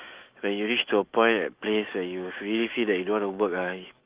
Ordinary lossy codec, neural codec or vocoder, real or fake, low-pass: Opus, 32 kbps; autoencoder, 48 kHz, 128 numbers a frame, DAC-VAE, trained on Japanese speech; fake; 3.6 kHz